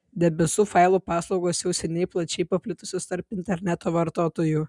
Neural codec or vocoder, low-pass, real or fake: none; 10.8 kHz; real